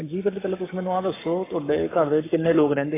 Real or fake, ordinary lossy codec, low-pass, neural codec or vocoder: fake; AAC, 16 kbps; 3.6 kHz; codec, 44.1 kHz, 7.8 kbps, Pupu-Codec